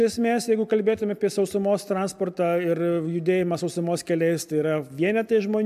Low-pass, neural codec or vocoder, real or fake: 14.4 kHz; none; real